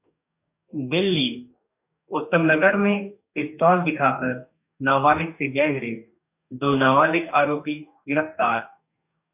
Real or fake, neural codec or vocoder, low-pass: fake; codec, 44.1 kHz, 2.6 kbps, DAC; 3.6 kHz